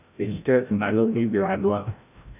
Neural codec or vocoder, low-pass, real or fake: codec, 16 kHz, 0.5 kbps, FreqCodec, larger model; 3.6 kHz; fake